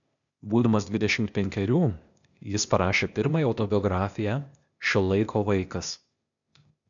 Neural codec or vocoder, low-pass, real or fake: codec, 16 kHz, 0.8 kbps, ZipCodec; 7.2 kHz; fake